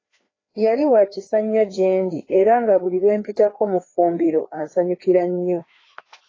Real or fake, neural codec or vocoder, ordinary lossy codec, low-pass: fake; codec, 16 kHz, 2 kbps, FreqCodec, larger model; AAC, 32 kbps; 7.2 kHz